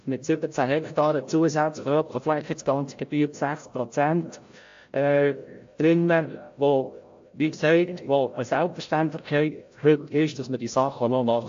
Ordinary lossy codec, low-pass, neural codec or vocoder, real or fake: AAC, 48 kbps; 7.2 kHz; codec, 16 kHz, 0.5 kbps, FreqCodec, larger model; fake